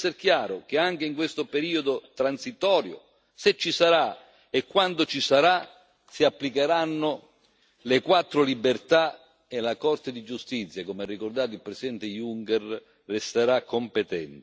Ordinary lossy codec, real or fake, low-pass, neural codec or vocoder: none; real; none; none